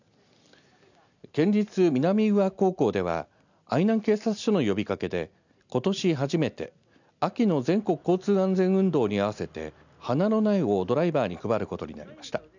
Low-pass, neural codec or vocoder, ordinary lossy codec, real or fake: 7.2 kHz; none; none; real